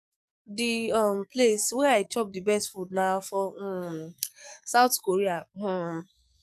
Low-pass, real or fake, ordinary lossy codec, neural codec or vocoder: 14.4 kHz; fake; none; codec, 44.1 kHz, 7.8 kbps, DAC